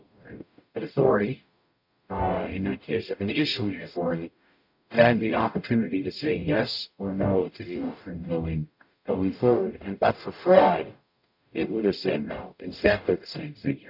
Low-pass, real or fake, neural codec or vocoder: 5.4 kHz; fake; codec, 44.1 kHz, 0.9 kbps, DAC